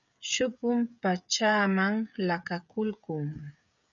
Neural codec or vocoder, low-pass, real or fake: codec, 16 kHz, 16 kbps, FreqCodec, smaller model; 7.2 kHz; fake